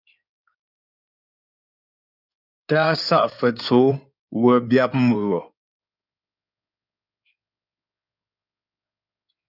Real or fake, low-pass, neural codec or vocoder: fake; 5.4 kHz; codec, 16 kHz in and 24 kHz out, 2.2 kbps, FireRedTTS-2 codec